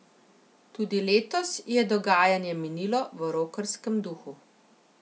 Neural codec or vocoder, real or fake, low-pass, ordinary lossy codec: none; real; none; none